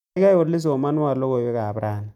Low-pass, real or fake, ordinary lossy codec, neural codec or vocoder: 19.8 kHz; real; none; none